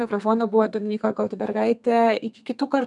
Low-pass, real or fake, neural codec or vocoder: 10.8 kHz; fake; codec, 32 kHz, 1.9 kbps, SNAC